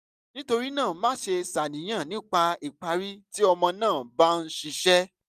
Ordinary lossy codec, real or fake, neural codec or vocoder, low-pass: none; real; none; 14.4 kHz